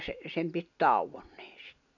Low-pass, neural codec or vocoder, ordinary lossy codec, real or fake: 7.2 kHz; none; none; real